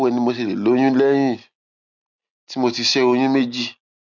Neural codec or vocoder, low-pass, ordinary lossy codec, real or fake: none; 7.2 kHz; none; real